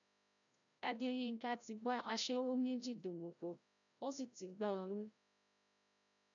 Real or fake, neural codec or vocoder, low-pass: fake; codec, 16 kHz, 0.5 kbps, FreqCodec, larger model; 7.2 kHz